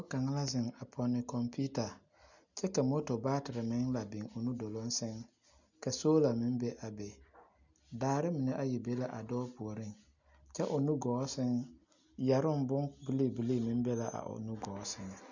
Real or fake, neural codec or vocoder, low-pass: real; none; 7.2 kHz